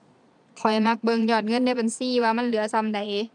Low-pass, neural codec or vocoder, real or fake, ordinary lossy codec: 9.9 kHz; vocoder, 22.05 kHz, 80 mel bands, Vocos; fake; MP3, 96 kbps